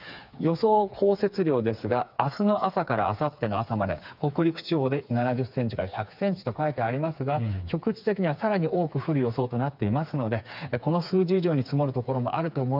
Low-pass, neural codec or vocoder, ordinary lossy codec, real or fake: 5.4 kHz; codec, 16 kHz, 4 kbps, FreqCodec, smaller model; none; fake